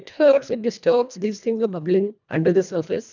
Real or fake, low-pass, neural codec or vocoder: fake; 7.2 kHz; codec, 24 kHz, 1.5 kbps, HILCodec